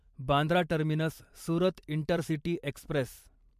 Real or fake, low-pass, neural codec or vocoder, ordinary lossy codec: fake; 14.4 kHz; vocoder, 44.1 kHz, 128 mel bands every 512 samples, BigVGAN v2; MP3, 64 kbps